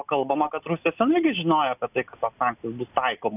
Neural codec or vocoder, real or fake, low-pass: none; real; 3.6 kHz